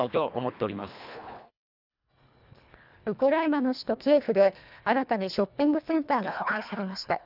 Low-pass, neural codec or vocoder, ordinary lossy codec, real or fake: 5.4 kHz; codec, 24 kHz, 1.5 kbps, HILCodec; none; fake